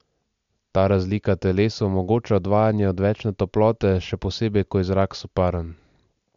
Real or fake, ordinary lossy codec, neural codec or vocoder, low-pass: real; MP3, 64 kbps; none; 7.2 kHz